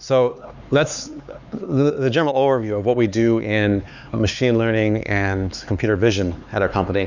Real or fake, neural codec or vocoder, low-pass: fake; codec, 16 kHz, 4 kbps, X-Codec, HuBERT features, trained on LibriSpeech; 7.2 kHz